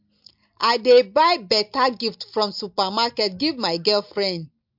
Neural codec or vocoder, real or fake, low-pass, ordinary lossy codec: none; real; 5.4 kHz; MP3, 48 kbps